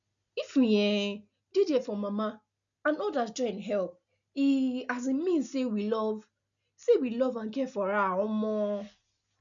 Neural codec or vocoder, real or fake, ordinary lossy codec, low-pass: none; real; none; 7.2 kHz